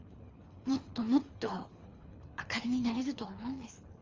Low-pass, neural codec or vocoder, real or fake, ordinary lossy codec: 7.2 kHz; codec, 24 kHz, 3 kbps, HILCodec; fake; Opus, 32 kbps